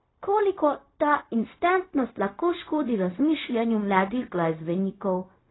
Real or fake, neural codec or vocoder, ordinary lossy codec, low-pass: fake; codec, 16 kHz, 0.4 kbps, LongCat-Audio-Codec; AAC, 16 kbps; 7.2 kHz